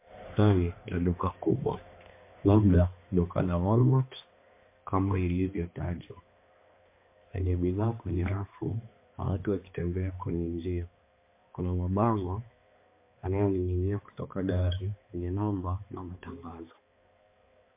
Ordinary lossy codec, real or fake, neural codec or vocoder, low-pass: MP3, 24 kbps; fake; codec, 16 kHz, 2 kbps, X-Codec, HuBERT features, trained on balanced general audio; 3.6 kHz